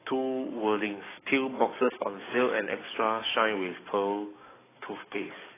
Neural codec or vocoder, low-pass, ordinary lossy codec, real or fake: codec, 44.1 kHz, 7.8 kbps, DAC; 3.6 kHz; AAC, 16 kbps; fake